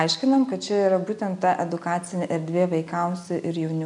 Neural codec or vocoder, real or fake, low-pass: none; real; 10.8 kHz